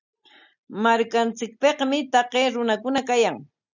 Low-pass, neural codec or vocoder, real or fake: 7.2 kHz; none; real